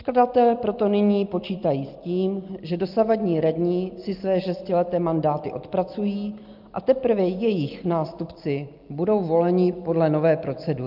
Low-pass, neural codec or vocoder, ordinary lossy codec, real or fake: 5.4 kHz; none; Opus, 24 kbps; real